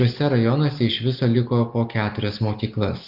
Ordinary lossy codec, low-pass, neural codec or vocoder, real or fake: Opus, 32 kbps; 5.4 kHz; none; real